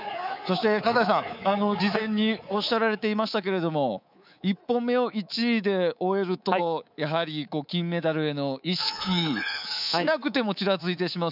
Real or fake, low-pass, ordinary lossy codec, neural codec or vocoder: fake; 5.4 kHz; none; codec, 24 kHz, 3.1 kbps, DualCodec